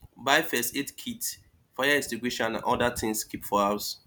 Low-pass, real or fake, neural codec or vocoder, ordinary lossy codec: none; real; none; none